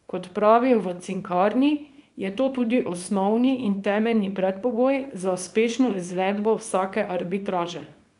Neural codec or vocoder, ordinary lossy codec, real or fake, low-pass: codec, 24 kHz, 0.9 kbps, WavTokenizer, small release; none; fake; 10.8 kHz